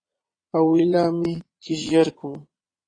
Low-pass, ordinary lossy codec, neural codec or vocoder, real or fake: 9.9 kHz; AAC, 32 kbps; vocoder, 22.05 kHz, 80 mel bands, Vocos; fake